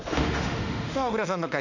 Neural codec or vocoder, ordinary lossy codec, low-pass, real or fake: autoencoder, 48 kHz, 32 numbers a frame, DAC-VAE, trained on Japanese speech; none; 7.2 kHz; fake